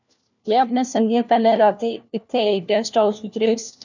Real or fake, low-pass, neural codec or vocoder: fake; 7.2 kHz; codec, 16 kHz, 1 kbps, FunCodec, trained on LibriTTS, 50 frames a second